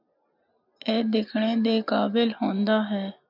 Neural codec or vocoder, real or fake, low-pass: none; real; 5.4 kHz